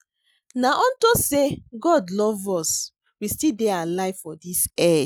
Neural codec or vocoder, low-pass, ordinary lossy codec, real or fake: none; none; none; real